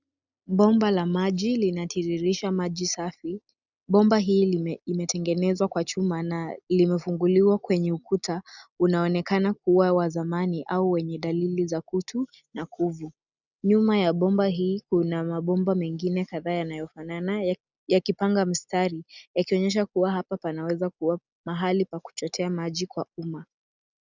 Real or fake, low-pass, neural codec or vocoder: real; 7.2 kHz; none